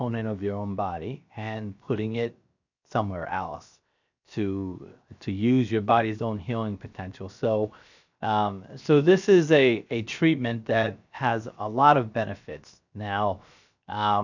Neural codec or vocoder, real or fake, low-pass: codec, 16 kHz, 0.7 kbps, FocalCodec; fake; 7.2 kHz